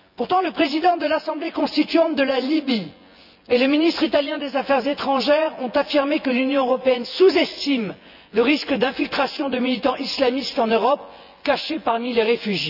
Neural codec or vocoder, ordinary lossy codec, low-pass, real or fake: vocoder, 24 kHz, 100 mel bands, Vocos; none; 5.4 kHz; fake